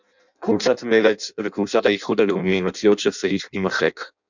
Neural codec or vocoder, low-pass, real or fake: codec, 16 kHz in and 24 kHz out, 0.6 kbps, FireRedTTS-2 codec; 7.2 kHz; fake